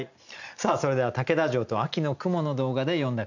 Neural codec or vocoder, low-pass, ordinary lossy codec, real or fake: none; 7.2 kHz; none; real